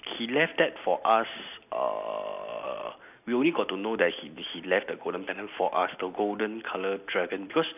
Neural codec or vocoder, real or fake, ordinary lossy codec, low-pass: none; real; none; 3.6 kHz